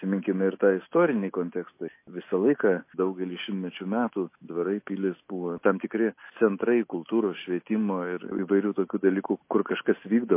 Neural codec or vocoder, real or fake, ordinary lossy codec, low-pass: none; real; MP3, 24 kbps; 3.6 kHz